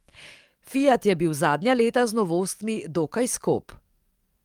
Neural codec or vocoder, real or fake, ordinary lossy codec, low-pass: codec, 44.1 kHz, 7.8 kbps, DAC; fake; Opus, 32 kbps; 19.8 kHz